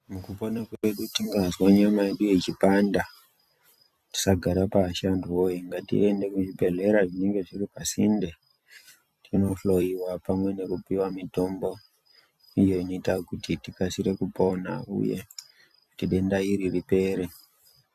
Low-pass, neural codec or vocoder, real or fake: 14.4 kHz; vocoder, 44.1 kHz, 128 mel bands every 256 samples, BigVGAN v2; fake